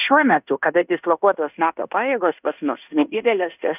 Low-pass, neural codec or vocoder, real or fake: 3.6 kHz; codec, 16 kHz in and 24 kHz out, 0.9 kbps, LongCat-Audio-Codec, fine tuned four codebook decoder; fake